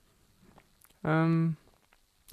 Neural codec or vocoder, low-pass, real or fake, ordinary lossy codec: none; 14.4 kHz; real; MP3, 64 kbps